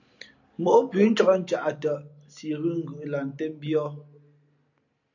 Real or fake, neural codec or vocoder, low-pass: real; none; 7.2 kHz